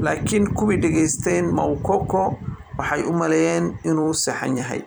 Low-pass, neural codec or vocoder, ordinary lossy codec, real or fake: none; vocoder, 44.1 kHz, 128 mel bands every 256 samples, BigVGAN v2; none; fake